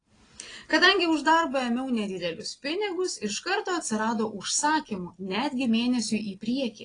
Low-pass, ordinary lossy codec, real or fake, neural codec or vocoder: 9.9 kHz; AAC, 32 kbps; real; none